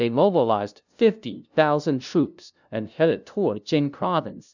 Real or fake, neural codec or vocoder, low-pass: fake; codec, 16 kHz, 0.5 kbps, FunCodec, trained on LibriTTS, 25 frames a second; 7.2 kHz